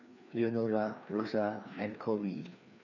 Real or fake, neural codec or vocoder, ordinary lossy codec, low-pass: fake; codec, 16 kHz, 2 kbps, FreqCodec, larger model; none; 7.2 kHz